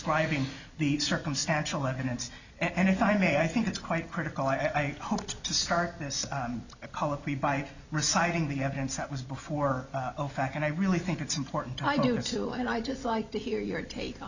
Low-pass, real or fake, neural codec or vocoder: 7.2 kHz; real; none